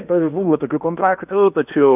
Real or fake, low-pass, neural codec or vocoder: fake; 3.6 kHz; codec, 16 kHz in and 24 kHz out, 0.8 kbps, FocalCodec, streaming, 65536 codes